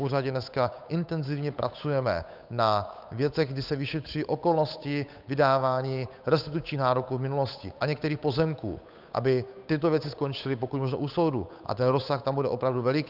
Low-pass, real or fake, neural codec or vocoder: 5.4 kHz; fake; codec, 16 kHz, 8 kbps, FunCodec, trained on Chinese and English, 25 frames a second